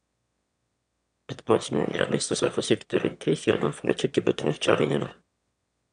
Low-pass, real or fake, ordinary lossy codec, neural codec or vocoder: 9.9 kHz; fake; none; autoencoder, 22.05 kHz, a latent of 192 numbers a frame, VITS, trained on one speaker